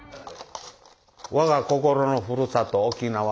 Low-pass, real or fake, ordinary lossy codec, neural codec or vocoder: none; real; none; none